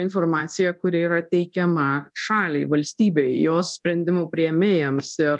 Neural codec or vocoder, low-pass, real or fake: codec, 24 kHz, 0.9 kbps, DualCodec; 10.8 kHz; fake